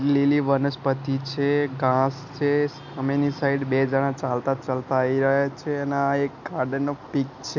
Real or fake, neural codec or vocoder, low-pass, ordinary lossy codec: real; none; 7.2 kHz; none